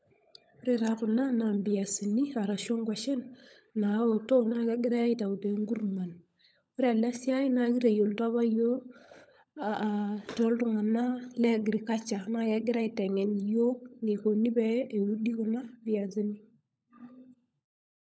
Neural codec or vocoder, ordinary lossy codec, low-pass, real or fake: codec, 16 kHz, 16 kbps, FunCodec, trained on LibriTTS, 50 frames a second; none; none; fake